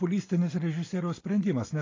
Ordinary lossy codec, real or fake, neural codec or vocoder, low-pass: AAC, 32 kbps; real; none; 7.2 kHz